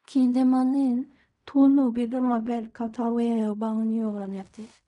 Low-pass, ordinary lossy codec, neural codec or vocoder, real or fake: 10.8 kHz; none; codec, 16 kHz in and 24 kHz out, 0.4 kbps, LongCat-Audio-Codec, fine tuned four codebook decoder; fake